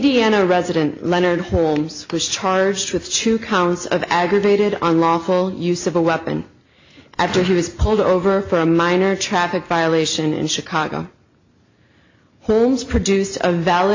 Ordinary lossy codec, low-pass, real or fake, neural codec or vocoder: AAC, 48 kbps; 7.2 kHz; real; none